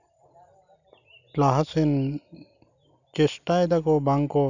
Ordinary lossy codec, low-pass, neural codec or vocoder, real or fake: none; 7.2 kHz; none; real